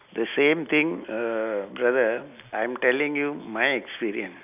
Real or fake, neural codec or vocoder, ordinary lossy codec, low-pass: real; none; none; 3.6 kHz